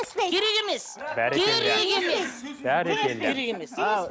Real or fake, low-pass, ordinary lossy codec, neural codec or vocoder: real; none; none; none